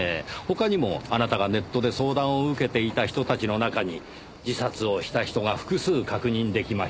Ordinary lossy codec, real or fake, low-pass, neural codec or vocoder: none; real; none; none